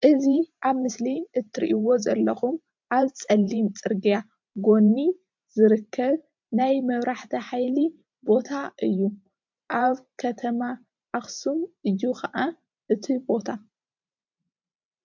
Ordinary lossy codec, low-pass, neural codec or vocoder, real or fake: MP3, 64 kbps; 7.2 kHz; vocoder, 44.1 kHz, 128 mel bands every 512 samples, BigVGAN v2; fake